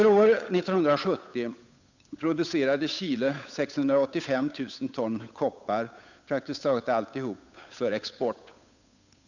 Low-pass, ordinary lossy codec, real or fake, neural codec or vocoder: 7.2 kHz; none; fake; codec, 16 kHz, 8 kbps, FunCodec, trained on Chinese and English, 25 frames a second